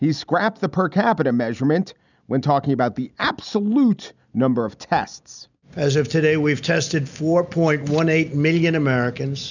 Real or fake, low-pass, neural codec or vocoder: real; 7.2 kHz; none